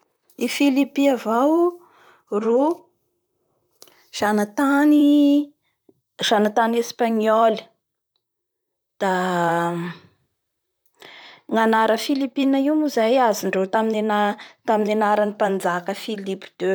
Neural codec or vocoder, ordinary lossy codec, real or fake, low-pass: vocoder, 44.1 kHz, 128 mel bands, Pupu-Vocoder; none; fake; none